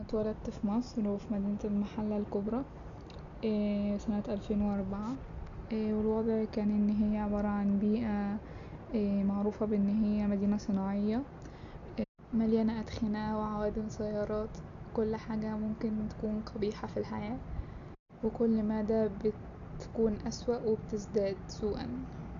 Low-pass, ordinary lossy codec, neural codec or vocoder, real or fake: 7.2 kHz; none; none; real